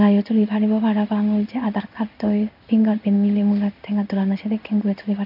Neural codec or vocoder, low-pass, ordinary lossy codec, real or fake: codec, 16 kHz in and 24 kHz out, 1 kbps, XY-Tokenizer; 5.4 kHz; none; fake